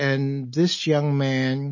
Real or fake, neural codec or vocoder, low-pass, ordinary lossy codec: real; none; 7.2 kHz; MP3, 32 kbps